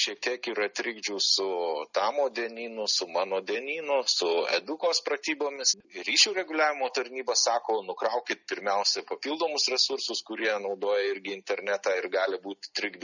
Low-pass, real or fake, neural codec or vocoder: 7.2 kHz; real; none